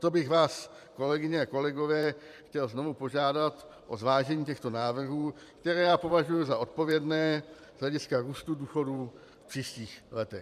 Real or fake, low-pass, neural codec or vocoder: fake; 14.4 kHz; vocoder, 44.1 kHz, 128 mel bands every 512 samples, BigVGAN v2